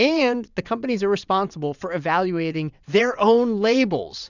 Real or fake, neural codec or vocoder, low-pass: fake; vocoder, 22.05 kHz, 80 mel bands, WaveNeXt; 7.2 kHz